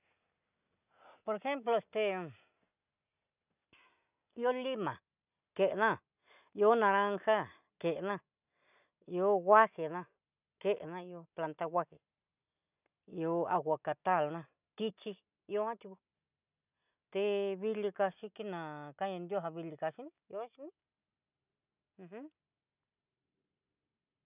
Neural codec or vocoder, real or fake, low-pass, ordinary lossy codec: none; real; 3.6 kHz; none